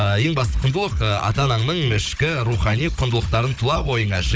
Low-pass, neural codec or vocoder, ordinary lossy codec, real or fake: none; codec, 16 kHz, 16 kbps, FunCodec, trained on Chinese and English, 50 frames a second; none; fake